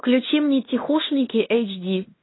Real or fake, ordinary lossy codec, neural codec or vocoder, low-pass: fake; AAC, 16 kbps; codec, 16 kHz in and 24 kHz out, 0.9 kbps, LongCat-Audio-Codec, fine tuned four codebook decoder; 7.2 kHz